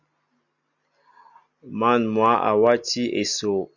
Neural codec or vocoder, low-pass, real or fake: none; 7.2 kHz; real